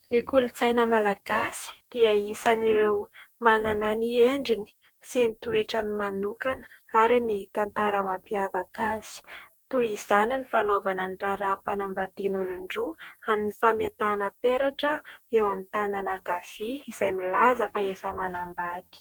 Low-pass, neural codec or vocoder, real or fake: 19.8 kHz; codec, 44.1 kHz, 2.6 kbps, DAC; fake